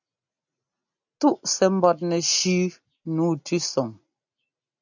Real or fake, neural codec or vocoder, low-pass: real; none; 7.2 kHz